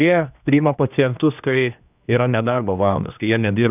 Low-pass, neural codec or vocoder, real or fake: 3.6 kHz; codec, 16 kHz, 1 kbps, X-Codec, HuBERT features, trained on general audio; fake